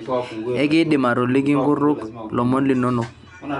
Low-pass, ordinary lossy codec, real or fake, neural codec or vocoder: 10.8 kHz; none; real; none